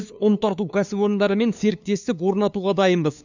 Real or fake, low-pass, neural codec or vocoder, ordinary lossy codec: fake; 7.2 kHz; codec, 16 kHz, 2 kbps, FunCodec, trained on LibriTTS, 25 frames a second; none